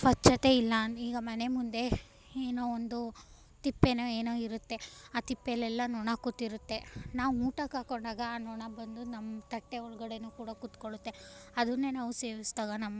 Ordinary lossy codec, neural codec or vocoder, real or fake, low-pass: none; none; real; none